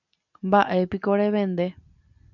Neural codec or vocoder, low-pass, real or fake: none; 7.2 kHz; real